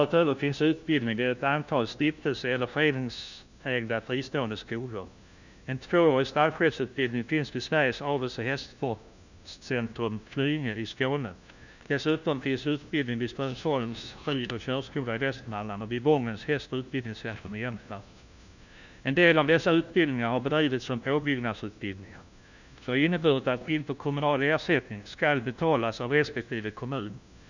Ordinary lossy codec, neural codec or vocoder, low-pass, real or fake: none; codec, 16 kHz, 1 kbps, FunCodec, trained on LibriTTS, 50 frames a second; 7.2 kHz; fake